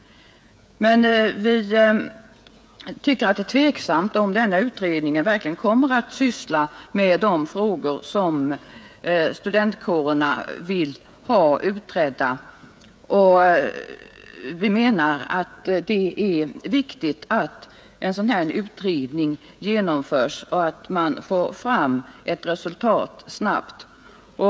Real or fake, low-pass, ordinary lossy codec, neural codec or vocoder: fake; none; none; codec, 16 kHz, 16 kbps, FreqCodec, smaller model